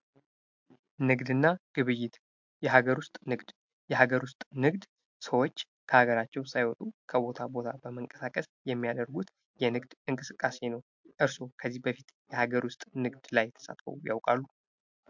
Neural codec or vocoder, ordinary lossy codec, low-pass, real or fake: none; MP3, 64 kbps; 7.2 kHz; real